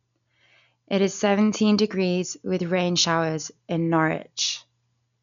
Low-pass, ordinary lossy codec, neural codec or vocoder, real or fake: 7.2 kHz; none; none; real